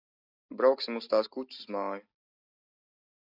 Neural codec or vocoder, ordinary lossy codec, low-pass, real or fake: none; AAC, 48 kbps; 5.4 kHz; real